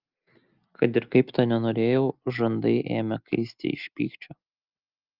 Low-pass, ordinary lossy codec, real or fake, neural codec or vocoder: 5.4 kHz; Opus, 24 kbps; real; none